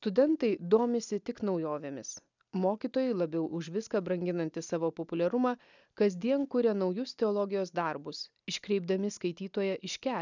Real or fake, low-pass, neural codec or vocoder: real; 7.2 kHz; none